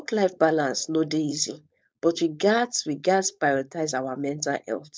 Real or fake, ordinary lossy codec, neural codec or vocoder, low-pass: fake; none; codec, 16 kHz, 4.8 kbps, FACodec; none